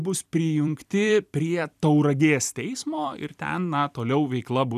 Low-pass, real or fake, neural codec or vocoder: 14.4 kHz; fake; vocoder, 44.1 kHz, 128 mel bands every 256 samples, BigVGAN v2